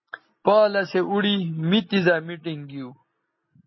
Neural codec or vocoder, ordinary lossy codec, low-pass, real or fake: none; MP3, 24 kbps; 7.2 kHz; real